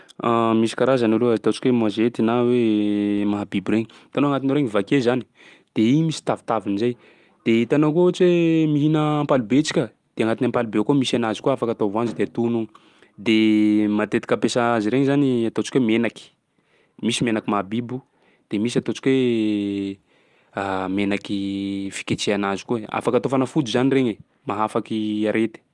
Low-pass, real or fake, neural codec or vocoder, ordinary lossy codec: 10.8 kHz; real; none; Opus, 32 kbps